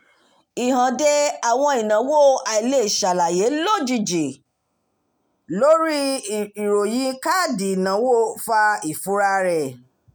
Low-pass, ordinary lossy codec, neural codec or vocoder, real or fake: none; none; none; real